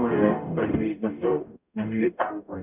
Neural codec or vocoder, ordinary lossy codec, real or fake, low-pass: codec, 44.1 kHz, 0.9 kbps, DAC; none; fake; 3.6 kHz